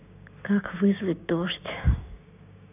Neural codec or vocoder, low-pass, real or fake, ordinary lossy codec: codec, 16 kHz, 6 kbps, DAC; 3.6 kHz; fake; none